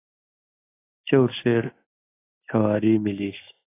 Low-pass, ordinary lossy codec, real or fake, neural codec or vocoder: 3.6 kHz; AAC, 16 kbps; real; none